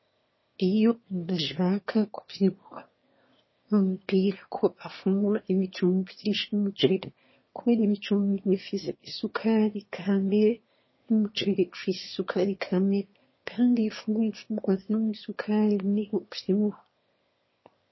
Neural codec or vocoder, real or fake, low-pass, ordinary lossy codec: autoencoder, 22.05 kHz, a latent of 192 numbers a frame, VITS, trained on one speaker; fake; 7.2 kHz; MP3, 24 kbps